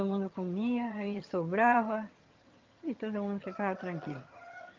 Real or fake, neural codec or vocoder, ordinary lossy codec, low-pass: fake; vocoder, 22.05 kHz, 80 mel bands, HiFi-GAN; Opus, 24 kbps; 7.2 kHz